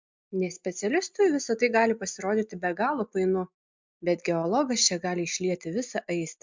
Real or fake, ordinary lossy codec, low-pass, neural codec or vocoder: real; MP3, 64 kbps; 7.2 kHz; none